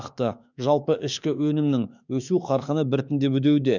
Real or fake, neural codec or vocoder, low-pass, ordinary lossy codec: fake; autoencoder, 48 kHz, 32 numbers a frame, DAC-VAE, trained on Japanese speech; 7.2 kHz; none